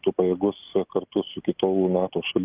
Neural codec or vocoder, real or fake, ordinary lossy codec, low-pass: none; real; Opus, 24 kbps; 3.6 kHz